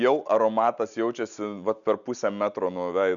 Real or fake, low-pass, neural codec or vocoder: real; 7.2 kHz; none